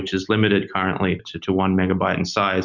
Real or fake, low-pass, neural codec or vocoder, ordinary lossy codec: real; 7.2 kHz; none; Opus, 64 kbps